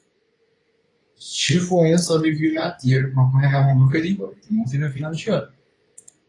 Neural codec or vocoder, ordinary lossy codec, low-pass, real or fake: codec, 24 kHz, 0.9 kbps, WavTokenizer, medium speech release version 2; AAC, 32 kbps; 10.8 kHz; fake